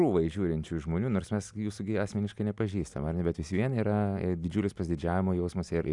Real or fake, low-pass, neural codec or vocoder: real; 10.8 kHz; none